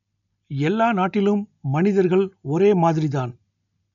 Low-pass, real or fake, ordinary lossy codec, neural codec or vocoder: 7.2 kHz; real; none; none